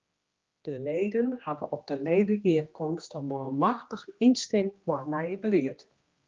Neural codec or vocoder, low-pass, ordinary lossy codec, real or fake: codec, 16 kHz, 1 kbps, X-Codec, HuBERT features, trained on general audio; 7.2 kHz; Opus, 32 kbps; fake